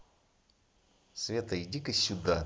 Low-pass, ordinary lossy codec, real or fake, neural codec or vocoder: none; none; real; none